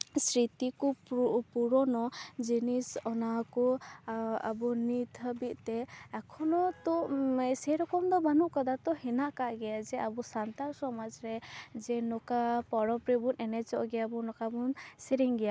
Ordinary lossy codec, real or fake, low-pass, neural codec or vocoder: none; real; none; none